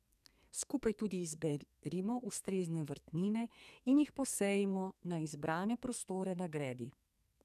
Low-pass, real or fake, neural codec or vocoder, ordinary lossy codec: 14.4 kHz; fake; codec, 44.1 kHz, 2.6 kbps, SNAC; none